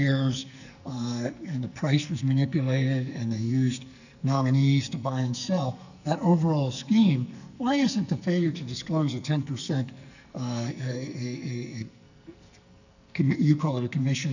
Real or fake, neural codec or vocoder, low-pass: fake; codec, 44.1 kHz, 2.6 kbps, SNAC; 7.2 kHz